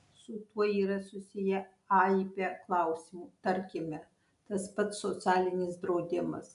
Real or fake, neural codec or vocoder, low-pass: real; none; 10.8 kHz